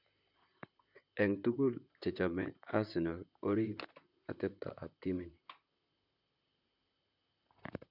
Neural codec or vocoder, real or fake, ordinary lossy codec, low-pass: vocoder, 44.1 kHz, 128 mel bands, Pupu-Vocoder; fake; none; 5.4 kHz